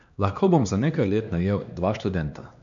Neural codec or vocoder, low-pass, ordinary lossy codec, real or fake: codec, 16 kHz, 2 kbps, X-Codec, HuBERT features, trained on LibriSpeech; 7.2 kHz; none; fake